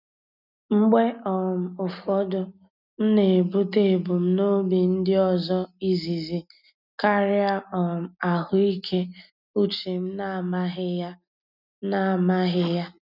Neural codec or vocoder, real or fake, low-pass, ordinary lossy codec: none; real; 5.4 kHz; none